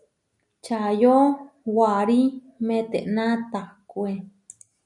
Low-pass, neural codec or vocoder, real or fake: 10.8 kHz; none; real